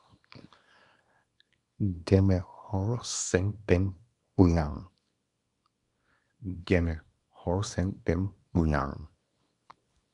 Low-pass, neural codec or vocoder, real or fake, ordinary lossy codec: 10.8 kHz; codec, 24 kHz, 0.9 kbps, WavTokenizer, small release; fake; MP3, 96 kbps